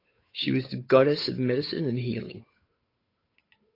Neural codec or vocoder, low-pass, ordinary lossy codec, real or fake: codec, 16 kHz, 8 kbps, FunCodec, trained on Chinese and English, 25 frames a second; 5.4 kHz; AAC, 24 kbps; fake